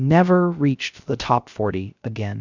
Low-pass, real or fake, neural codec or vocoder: 7.2 kHz; fake; codec, 16 kHz, 0.3 kbps, FocalCodec